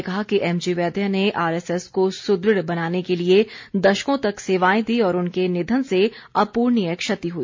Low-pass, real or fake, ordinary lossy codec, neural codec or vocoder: 7.2 kHz; real; MP3, 48 kbps; none